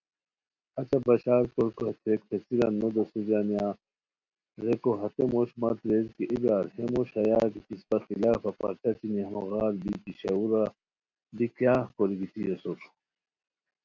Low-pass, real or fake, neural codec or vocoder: 7.2 kHz; real; none